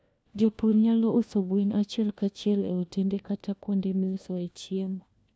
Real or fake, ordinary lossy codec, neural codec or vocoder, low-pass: fake; none; codec, 16 kHz, 1 kbps, FunCodec, trained on LibriTTS, 50 frames a second; none